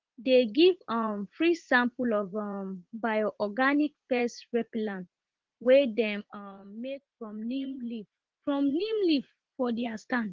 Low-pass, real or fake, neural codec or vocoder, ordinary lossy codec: 7.2 kHz; fake; vocoder, 44.1 kHz, 80 mel bands, Vocos; Opus, 16 kbps